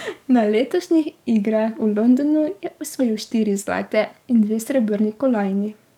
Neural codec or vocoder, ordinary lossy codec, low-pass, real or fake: codec, 44.1 kHz, 7.8 kbps, DAC; none; 19.8 kHz; fake